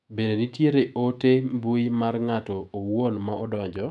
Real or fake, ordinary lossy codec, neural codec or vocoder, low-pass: fake; none; autoencoder, 48 kHz, 128 numbers a frame, DAC-VAE, trained on Japanese speech; 10.8 kHz